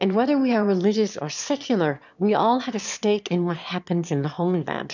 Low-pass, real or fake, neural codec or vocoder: 7.2 kHz; fake; autoencoder, 22.05 kHz, a latent of 192 numbers a frame, VITS, trained on one speaker